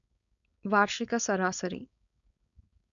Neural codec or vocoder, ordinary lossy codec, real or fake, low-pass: codec, 16 kHz, 4.8 kbps, FACodec; none; fake; 7.2 kHz